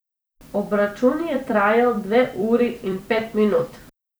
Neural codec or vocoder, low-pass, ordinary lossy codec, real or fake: vocoder, 44.1 kHz, 128 mel bands every 256 samples, BigVGAN v2; none; none; fake